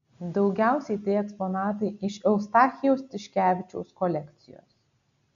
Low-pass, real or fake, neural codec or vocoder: 7.2 kHz; real; none